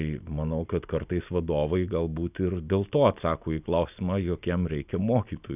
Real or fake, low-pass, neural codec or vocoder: real; 3.6 kHz; none